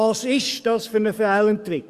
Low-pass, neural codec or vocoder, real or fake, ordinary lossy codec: 14.4 kHz; codec, 44.1 kHz, 7.8 kbps, DAC; fake; Opus, 64 kbps